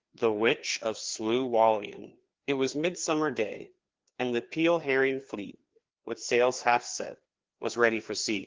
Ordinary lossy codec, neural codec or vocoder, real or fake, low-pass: Opus, 16 kbps; codec, 16 kHz, 2 kbps, FreqCodec, larger model; fake; 7.2 kHz